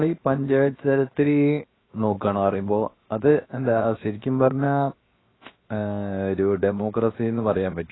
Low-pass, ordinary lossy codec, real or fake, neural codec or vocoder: 7.2 kHz; AAC, 16 kbps; fake; codec, 16 kHz, 0.7 kbps, FocalCodec